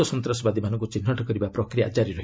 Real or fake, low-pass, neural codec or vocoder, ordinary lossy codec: real; none; none; none